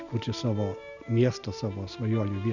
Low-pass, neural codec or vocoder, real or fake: 7.2 kHz; none; real